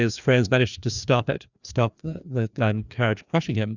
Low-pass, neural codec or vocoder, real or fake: 7.2 kHz; codec, 16 kHz, 2 kbps, FreqCodec, larger model; fake